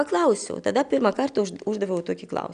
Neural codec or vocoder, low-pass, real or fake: none; 9.9 kHz; real